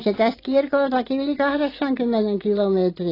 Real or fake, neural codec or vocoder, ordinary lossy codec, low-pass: real; none; AAC, 24 kbps; 5.4 kHz